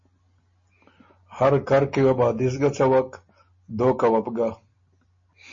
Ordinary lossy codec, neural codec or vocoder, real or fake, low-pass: MP3, 32 kbps; none; real; 7.2 kHz